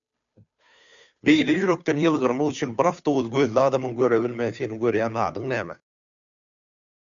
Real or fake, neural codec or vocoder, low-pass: fake; codec, 16 kHz, 2 kbps, FunCodec, trained on Chinese and English, 25 frames a second; 7.2 kHz